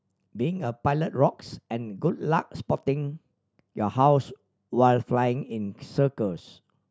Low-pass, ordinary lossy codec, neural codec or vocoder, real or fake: none; none; none; real